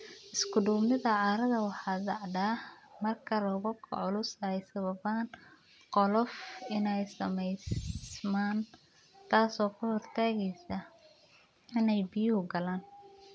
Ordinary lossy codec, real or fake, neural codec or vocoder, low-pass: none; real; none; none